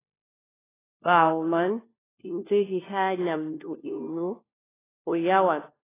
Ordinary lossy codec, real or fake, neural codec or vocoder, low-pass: AAC, 16 kbps; fake; codec, 16 kHz, 1 kbps, FunCodec, trained on LibriTTS, 50 frames a second; 3.6 kHz